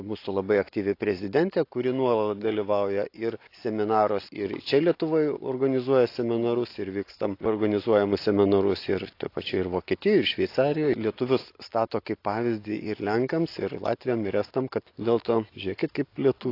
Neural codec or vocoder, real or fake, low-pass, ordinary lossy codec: none; real; 5.4 kHz; AAC, 32 kbps